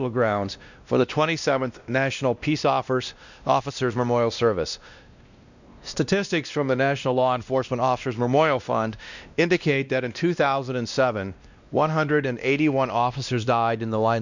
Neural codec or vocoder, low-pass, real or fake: codec, 16 kHz, 1 kbps, X-Codec, WavLM features, trained on Multilingual LibriSpeech; 7.2 kHz; fake